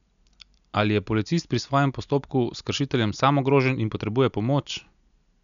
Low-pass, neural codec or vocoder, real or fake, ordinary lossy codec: 7.2 kHz; none; real; none